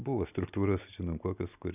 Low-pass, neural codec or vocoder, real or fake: 3.6 kHz; none; real